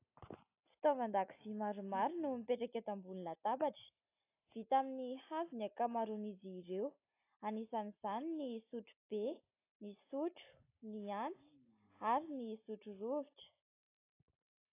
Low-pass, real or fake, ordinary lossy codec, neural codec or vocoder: 3.6 kHz; real; AAC, 24 kbps; none